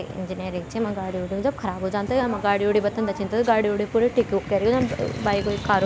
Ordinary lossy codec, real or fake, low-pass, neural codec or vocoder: none; real; none; none